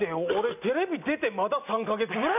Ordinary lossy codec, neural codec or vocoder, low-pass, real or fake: none; none; 3.6 kHz; real